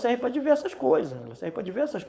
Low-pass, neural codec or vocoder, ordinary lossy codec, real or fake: none; codec, 16 kHz, 4.8 kbps, FACodec; none; fake